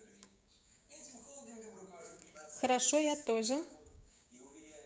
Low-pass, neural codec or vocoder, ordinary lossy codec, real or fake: none; codec, 16 kHz, 6 kbps, DAC; none; fake